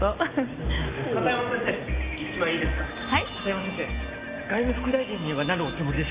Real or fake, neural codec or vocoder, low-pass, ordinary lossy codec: real; none; 3.6 kHz; Opus, 64 kbps